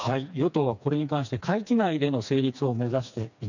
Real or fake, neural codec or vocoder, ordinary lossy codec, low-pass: fake; codec, 16 kHz, 2 kbps, FreqCodec, smaller model; none; 7.2 kHz